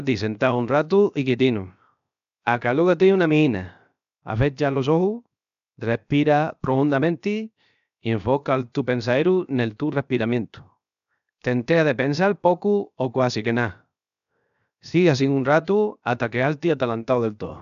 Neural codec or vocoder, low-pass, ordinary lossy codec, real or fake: codec, 16 kHz, 0.7 kbps, FocalCodec; 7.2 kHz; none; fake